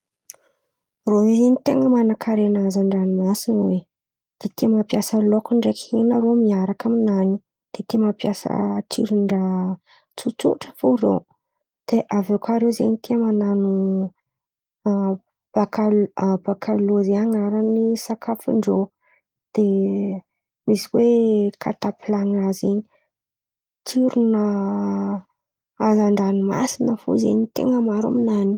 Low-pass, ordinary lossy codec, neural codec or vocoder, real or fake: 19.8 kHz; Opus, 24 kbps; none; real